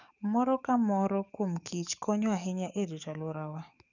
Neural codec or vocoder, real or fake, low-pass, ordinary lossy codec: codec, 44.1 kHz, 7.8 kbps, DAC; fake; 7.2 kHz; none